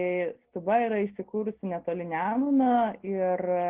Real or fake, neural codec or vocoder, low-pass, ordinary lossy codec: real; none; 3.6 kHz; Opus, 16 kbps